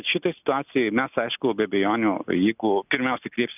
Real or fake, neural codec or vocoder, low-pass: real; none; 3.6 kHz